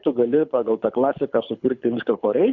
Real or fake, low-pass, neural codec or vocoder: fake; 7.2 kHz; codec, 24 kHz, 6 kbps, HILCodec